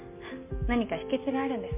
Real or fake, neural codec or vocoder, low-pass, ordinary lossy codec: real; none; 3.6 kHz; MP3, 32 kbps